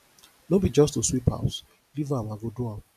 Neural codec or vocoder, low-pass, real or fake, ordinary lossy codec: none; 14.4 kHz; real; AAC, 96 kbps